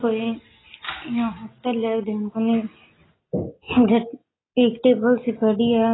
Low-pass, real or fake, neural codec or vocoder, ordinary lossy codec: 7.2 kHz; real; none; AAC, 16 kbps